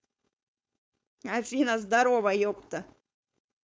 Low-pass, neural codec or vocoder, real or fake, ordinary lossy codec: none; codec, 16 kHz, 4.8 kbps, FACodec; fake; none